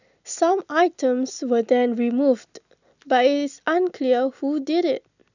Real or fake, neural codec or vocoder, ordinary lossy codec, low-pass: real; none; none; 7.2 kHz